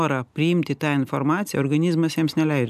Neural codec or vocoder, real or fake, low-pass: none; real; 14.4 kHz